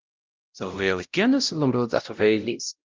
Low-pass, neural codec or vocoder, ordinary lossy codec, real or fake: 7.2 kHz; codec, 16 kHz, 0.5 kbps, X-Codec, WavLM features, trained on Multilingual LibriSpeech; Opus, 32 kbps; fake